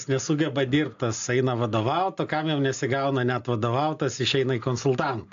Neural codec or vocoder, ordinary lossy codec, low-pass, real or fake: none; AAC, 64 kbps; 7.2 kHz; real